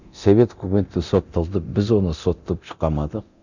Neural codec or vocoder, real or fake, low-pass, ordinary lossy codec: codec, 24 kHz, 0.9 kbps, DualCodec; fake; 7.2 kHz; none